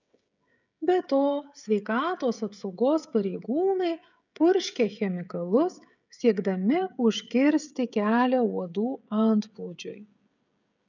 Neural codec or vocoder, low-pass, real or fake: codec, 16 kHz, 16 kbps, FreqCodec, smaller model; 7.2 kHz; fake